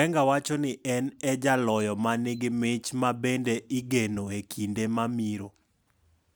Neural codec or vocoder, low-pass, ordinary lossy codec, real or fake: none; none; none; real